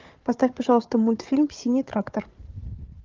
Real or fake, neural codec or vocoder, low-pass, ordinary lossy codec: fake; codec, 16 kHz, 4 kbps, FunCodec, trained on Chinese and English, 50 frames a second; 7.2 kHz; Opus, 32 kbps